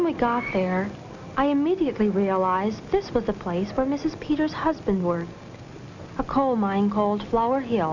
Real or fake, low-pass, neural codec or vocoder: real; 7.2 kHz; none